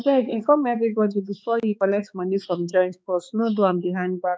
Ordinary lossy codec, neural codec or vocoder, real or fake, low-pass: none; codec, 16 kHz, 4 kbps, X-Codec, HuBERT features, trained on balanced general audio; fake; none